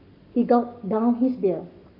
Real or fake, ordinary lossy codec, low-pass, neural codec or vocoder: fake; none; 5.4 kHz; codec, 44.1 kHz, 7.8 kbps, Pupu-Codec